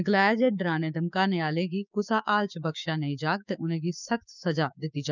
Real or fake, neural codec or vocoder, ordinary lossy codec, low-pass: fake; codec, 16 kHz, 6 kbps, DAC; none; 7.2 kHz